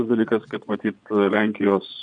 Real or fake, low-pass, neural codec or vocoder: fake; 9.9 kHz; vocoder, 22.05 kHz, 80 mel bands, Vocos